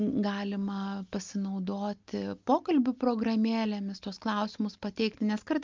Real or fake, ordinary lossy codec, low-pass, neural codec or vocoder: real; Opus, 24 kbps; 7.2 kHz; none